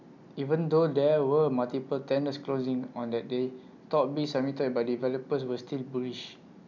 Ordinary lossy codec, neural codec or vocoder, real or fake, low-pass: none; none; real; 7.2 kHz